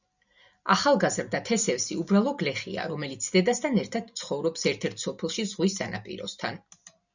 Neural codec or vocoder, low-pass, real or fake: none; 7.2 kHz; real